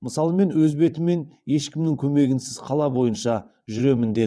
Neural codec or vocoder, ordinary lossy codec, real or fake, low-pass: vocoder, 22.05 kHz, 80 mel bands, WaveNeXt; none; fake; none